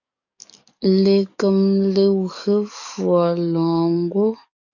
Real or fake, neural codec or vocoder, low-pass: fake; codec, 44.1 kHz, 7.8 kbps, DAC; 7.2 kHz